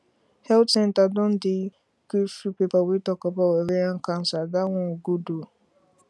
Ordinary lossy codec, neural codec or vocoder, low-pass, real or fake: none; none; none; real